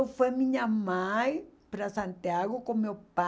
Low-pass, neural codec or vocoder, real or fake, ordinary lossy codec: none; none; real; none